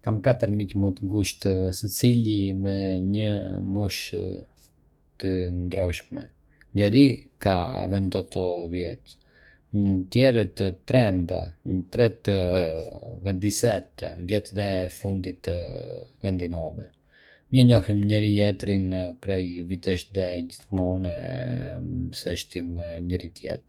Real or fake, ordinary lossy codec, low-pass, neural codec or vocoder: fake; none; 19.8 kHz; codec, 44.1 kHz, 2.6 kbps, DAC